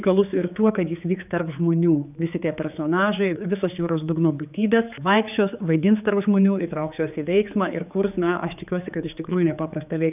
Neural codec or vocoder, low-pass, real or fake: codec, 16 kHz, 4 kbps, X-Codec, HuBERT features, trained on general audio; 3.6 kHz; fake